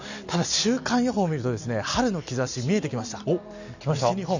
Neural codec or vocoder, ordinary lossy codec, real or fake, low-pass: none; none; real; 7.2 kHz